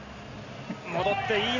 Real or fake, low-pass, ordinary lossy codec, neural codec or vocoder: real; 7.2 kHz; none; none